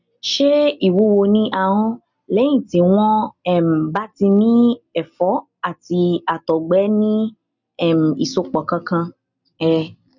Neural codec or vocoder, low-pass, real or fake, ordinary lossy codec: none; 7.2 kHz; real; none